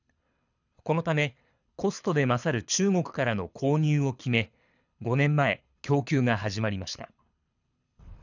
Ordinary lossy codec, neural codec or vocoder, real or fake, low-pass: none; codec, 24 kHz, 6 kbps, HILCodec; fake; 7.2 kHz